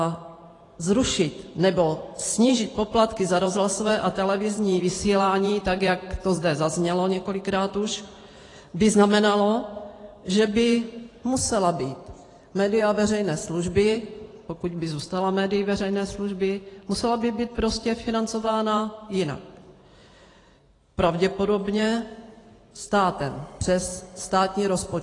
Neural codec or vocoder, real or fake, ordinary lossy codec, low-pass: vocoder, 44.1 kHz, 128 mel bands every 512 samples, BigVGAN v2; fake; AAC, 32 kbps; 10.8 kHz